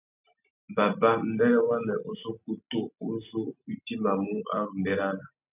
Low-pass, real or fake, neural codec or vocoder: 3.6 kHz; real; none